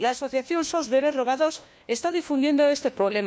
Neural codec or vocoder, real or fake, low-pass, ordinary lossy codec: codec, 16 kHz, 1 kbps, FunCodec, trained on LibriTTS, 50 frames a second; fake; none; none